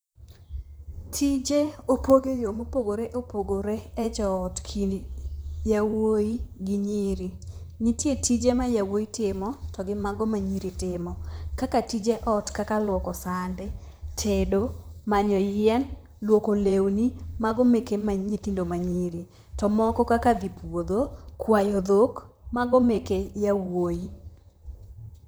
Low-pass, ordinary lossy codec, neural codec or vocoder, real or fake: none; none; vocoder, 44.1 kHz, 128 mel bands, Pupu-Vocoder; fake